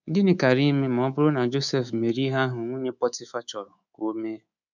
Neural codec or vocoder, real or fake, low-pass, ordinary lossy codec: codec, 24 kHz, 3.1 kbps, DualCodec; fake; 7.2 kHz; none